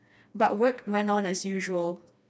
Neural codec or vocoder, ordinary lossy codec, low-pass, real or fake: codec, 16 kHz, 2 kbps, FreqCodec, smaller model; none; none; fake